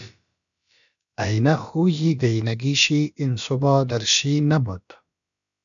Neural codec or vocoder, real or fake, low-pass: codec, 16 kHz, about 1 kbps, DyCAST, with the encoder's durations; fake; 7.2 kHz